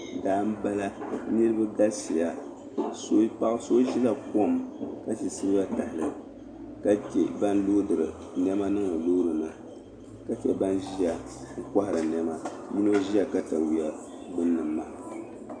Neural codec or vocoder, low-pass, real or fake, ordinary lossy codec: none; 9.9 kHz; real; MP3, 64 kbps